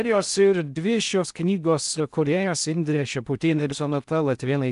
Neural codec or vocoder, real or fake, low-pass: codec, 16 kHz in and 24 kHz out, 0.6 kbps, FocalCodec, streaming, 4096 codes; fake; 10.8 kHz